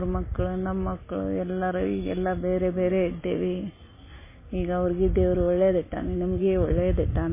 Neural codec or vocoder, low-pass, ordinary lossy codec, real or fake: none; 3.6 kHz; MP3, 16 kbps; real